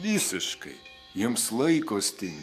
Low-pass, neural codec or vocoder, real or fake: 14.4 kHz; codec, 44.1 kHz, 7.8 kbps, DAC; fake